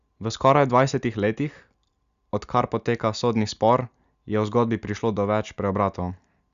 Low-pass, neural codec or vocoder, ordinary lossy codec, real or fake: 7.2 kHz; none; Opus, 64 kbps; real